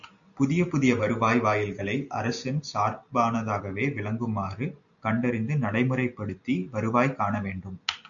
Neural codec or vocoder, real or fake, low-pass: none; real; 7.2 kHz